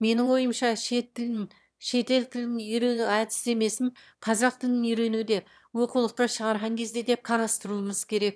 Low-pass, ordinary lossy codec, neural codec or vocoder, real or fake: none; none; autoencoder, 22.05 kHz, a latent of 192 numbers a frame, VITS, trained on one speaker; fake